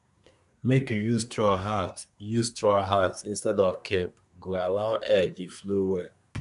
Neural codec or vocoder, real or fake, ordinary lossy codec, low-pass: codec, 24 kHz, 1 kbps, SNAC; fake; none; 10.8 kHz